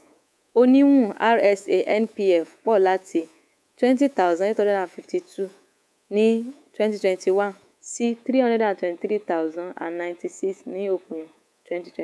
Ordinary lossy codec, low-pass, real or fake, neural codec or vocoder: none; none; fake; codec, 24 kHz, 3.1 kbps, DualCodec